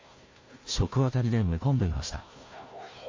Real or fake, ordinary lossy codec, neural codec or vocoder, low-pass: fake; MP3, 32 kbps; codec, 16 kHz, 1 kbps, FunCodec, trained on Chinese and English, 50 frames a second; 7.2 kHz